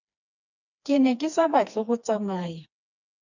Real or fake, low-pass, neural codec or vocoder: fake; 7.2 kHz; codec, 16 kHz, 2 kbps, FreqCodec, smaller model